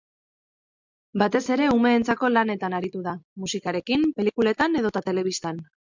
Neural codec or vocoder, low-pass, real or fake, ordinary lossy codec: none; 7.2 kHz; real; MP3, 64 kbps